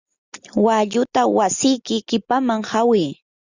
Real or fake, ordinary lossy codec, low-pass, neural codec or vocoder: real; Opus, 64 kbps; 7.2 kHz; none